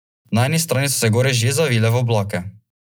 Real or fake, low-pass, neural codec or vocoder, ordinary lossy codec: real; none; none; none